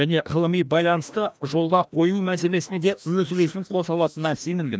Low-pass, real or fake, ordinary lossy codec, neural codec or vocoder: none; fake; none; codec, 16 kHz, 1 kbps, FreqCodec, larger model